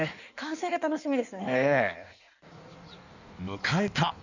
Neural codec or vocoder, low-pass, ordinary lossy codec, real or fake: codec, 16 kHz in and 24 kHz out, 1.1 kbps, FireRedTTS-2 codec; 7.2 kHz; none; fake